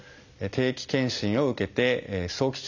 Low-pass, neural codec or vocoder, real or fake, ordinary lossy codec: 7.2 kHz; none; real; none